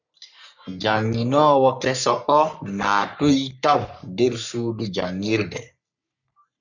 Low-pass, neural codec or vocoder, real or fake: 7.2 kHz; codec, 44.1 kHz, 3.4 kbps, Pupu-Codec; fake